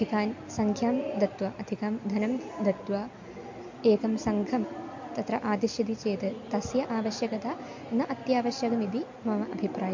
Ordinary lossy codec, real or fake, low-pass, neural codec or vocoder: MP3, 48 kbps; real; 7.2 kHz; none